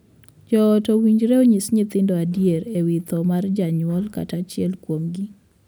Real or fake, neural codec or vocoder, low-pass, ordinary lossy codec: real; none; none; none